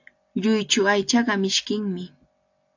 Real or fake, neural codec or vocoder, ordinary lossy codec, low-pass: real; none; AAC, 48 kbps; 7.2 kHz